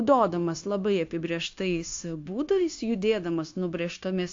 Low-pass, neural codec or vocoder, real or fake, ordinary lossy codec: 7.2 kHz; codec, 16 kHz, 0.9 kbps, LongCat-Audio-Codec; fake; AAC, 48 kbps